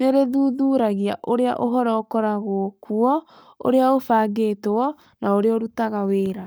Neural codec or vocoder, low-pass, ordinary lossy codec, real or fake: codec, 44.1 kHz, 7.8 kbps, Pupu-Codec; none; none; fake